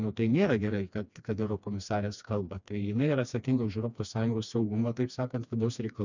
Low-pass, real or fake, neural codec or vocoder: 7.2 kHz; fake; codec, 16 kHz, 2 kbps, FreqCodec, smaller model